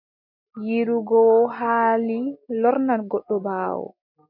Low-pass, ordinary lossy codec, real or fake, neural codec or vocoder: 5.4 kHz; MP3, 32 kbps; real; none